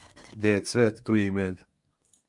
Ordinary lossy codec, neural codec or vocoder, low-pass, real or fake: MP3, 64 kbps; codec, 24 kHz, 1 kbps, SNAC; 10.8 kHz; fake